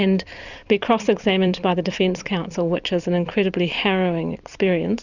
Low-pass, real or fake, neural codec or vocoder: 7.2 kHz; fake; vocoder, 44.1 kHz, 128 mel bands every 256 samples, BigVGAN v2